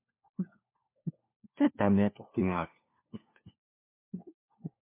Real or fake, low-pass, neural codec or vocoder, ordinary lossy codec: fake; 3.6 kHz; codec, 16 kHz, 1 kbps, FunCodec, trained on LibriTTS, 50 frames a second; MP3, 24 kbps